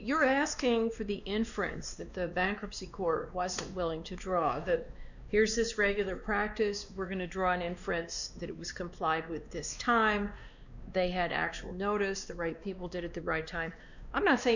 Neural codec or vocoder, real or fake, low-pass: codec, 16 kHz, 2 kbps, X-Codec, WavLM features, trained on Multilingual LibriSpeech; fake; 7.2 kHz